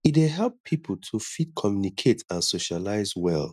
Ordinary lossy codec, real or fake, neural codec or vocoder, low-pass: none; real; none; 14.4 kHz